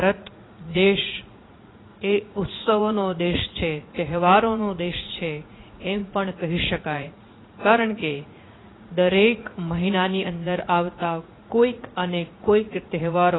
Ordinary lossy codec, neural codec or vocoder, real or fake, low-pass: AAC, 16 kbps; vocoder, 44.1 kHz, 80 mel bands, Vocos; fake; 7.2 kHz